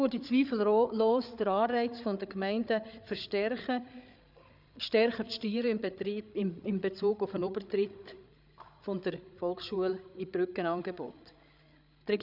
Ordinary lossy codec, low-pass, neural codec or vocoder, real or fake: none; 5.4 kHz; codec, 16 kHz, 8 kbps, FreqCodec, larger model; fake